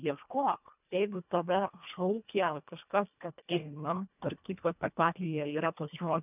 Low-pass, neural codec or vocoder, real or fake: 3.6 kHz; codec, 24 kHz, 1.5 kbps, HILCodec; fake